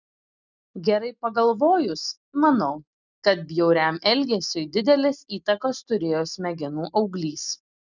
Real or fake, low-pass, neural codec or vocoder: real; 7.2 kHz; none